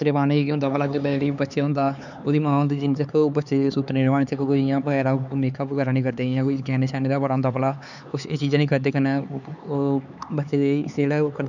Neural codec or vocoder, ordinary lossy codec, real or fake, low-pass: codec, 16 kHz, 4 kbps, X-Codec, HuBERT features, trained on LibriSpeech; none; fake; 7.2 kHz